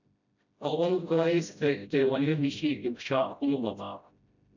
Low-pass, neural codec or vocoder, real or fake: 7.2 kHz; codec, 16 kHz, 0.5 kbps, FreqCodec, smaller model; fake